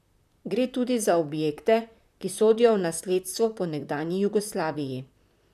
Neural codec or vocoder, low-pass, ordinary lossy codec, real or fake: vocoder, 44.1 kHz, 128 mel bands, Pupu-Vocoder; 14.4 kHz; none; fake